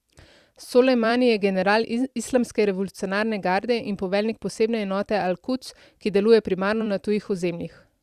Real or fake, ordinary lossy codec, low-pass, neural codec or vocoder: fake; Opus, 64 kbps; 14.4 kHz; vocoder, 44.1 kHz, 128 mel bands every 256 samples, BigVGAN v2